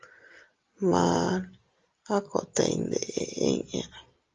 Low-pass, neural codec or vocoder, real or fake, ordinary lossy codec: 7.2 kHz; none; real; Opus, 32 kbps